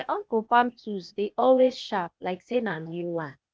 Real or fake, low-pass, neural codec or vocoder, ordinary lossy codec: fake; none; codec, 16 kHz, 0.8 kbps, ZipCodec; none